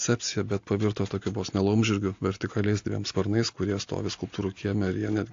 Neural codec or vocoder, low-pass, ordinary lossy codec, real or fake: none; 7.2 kHz; AAC, 48 kbps; real